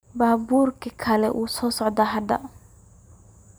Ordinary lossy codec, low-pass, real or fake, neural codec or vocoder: none; none; fake; vocoder, 44.1 kHz, 128 mel bands every 512 samples, BigVGAN v2